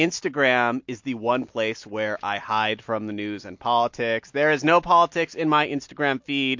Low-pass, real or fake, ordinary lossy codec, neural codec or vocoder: 7.2 kHz; real; MP3, 48 kbps; none